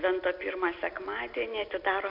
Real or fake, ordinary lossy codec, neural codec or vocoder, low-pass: real; MP3, 48 kbps; none; 5.4 kHz